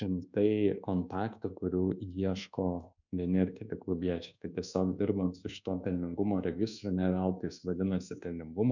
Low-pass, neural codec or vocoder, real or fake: 7.2 kHz; codec, 24 kHz, 1.2 kbps, DualCodec; fake